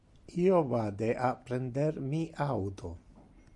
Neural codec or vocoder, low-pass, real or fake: none; 10.8 kHz; real